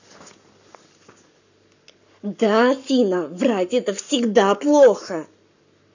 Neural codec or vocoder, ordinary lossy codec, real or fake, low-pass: codec, 44.1 kHz, 7.8 kbps, Pupu-Codec; none; fake; 7.2 kHz